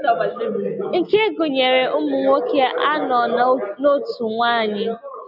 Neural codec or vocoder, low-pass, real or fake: none; 5.4 kHz; real